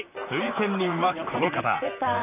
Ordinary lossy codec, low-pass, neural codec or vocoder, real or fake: none; 3.6 kHz; vocoder, 44.1 kHz, 128 mel bands, Pupu-Vocoder; fake